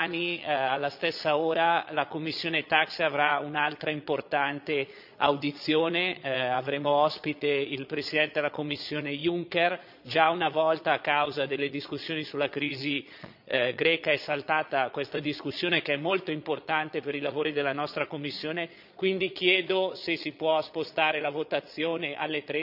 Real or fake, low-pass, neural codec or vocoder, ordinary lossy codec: fake; 5.4 kHz; vocoder, 22.05 kHz, 80 mel bands, Vocos; none